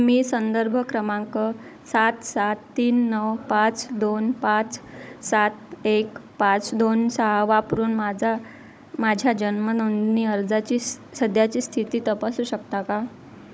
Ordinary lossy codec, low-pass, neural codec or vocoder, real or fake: none; none; codec, 16 kHz, 16 kbps, FunCodec, trained on Chinese and English, 50 frames a second; fake